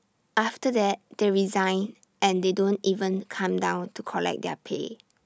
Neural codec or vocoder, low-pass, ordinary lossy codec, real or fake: none; none; none; real